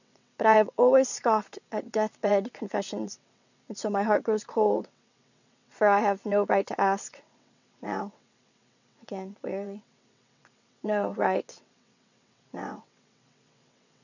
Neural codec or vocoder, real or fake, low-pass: vocoder, 22.05 kHz, 80 mel bands, WaveNeXt; fake; 7.2 kHz